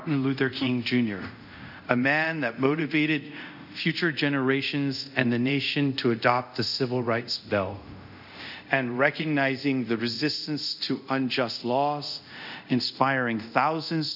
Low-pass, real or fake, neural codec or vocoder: 5.4 kHz; fake; codec, 24 kHz, 0.5 kbps, DualCodec